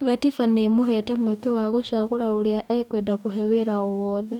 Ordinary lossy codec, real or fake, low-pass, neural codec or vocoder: none; fake; 19.8 kHz; codec, 44.1 kHz, 2.6 kbps, DAC